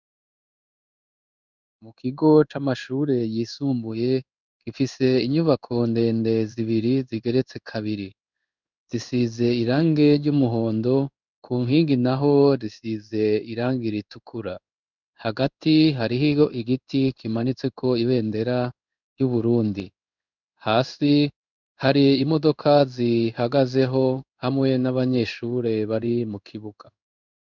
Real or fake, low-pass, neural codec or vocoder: fake; 7.2 kHz; codec, 16 kHz in and 24 kHz out, 1 kbps, XY-Tokenizer